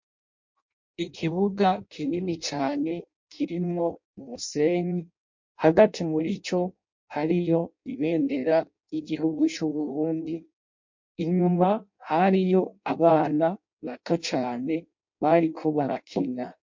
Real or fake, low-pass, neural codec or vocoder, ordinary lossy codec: fake; 7.2 kHz; codec, 16 kHz in and 24 kHz out, 0.6 kbps, FireRedTTS-2 codec; MP3, 48 kbps